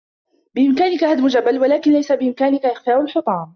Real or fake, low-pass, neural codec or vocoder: real; 7.2 kHz; none